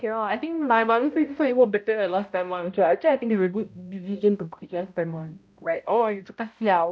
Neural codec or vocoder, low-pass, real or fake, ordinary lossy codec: codec, 16 kHz, 0.5 kbps, X-Codec, HuBERT features, trained on balanced general audio; none; fake; none